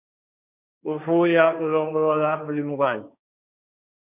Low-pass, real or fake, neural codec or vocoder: 3.6 kHz; fake; codec, 16 kHz, 1.1 kbps, Voila-Tokenizer